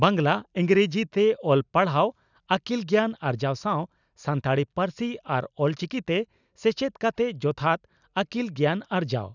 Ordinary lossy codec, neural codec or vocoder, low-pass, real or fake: none; none; 7.2 kHz; real